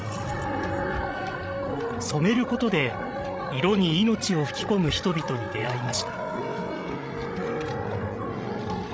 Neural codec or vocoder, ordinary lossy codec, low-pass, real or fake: codec, 16 kHz, 16 kbps, FreqCodec, larger model; none; none; fake